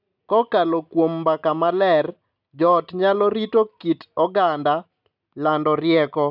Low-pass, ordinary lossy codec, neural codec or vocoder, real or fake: 5.4 kHz; none; none; real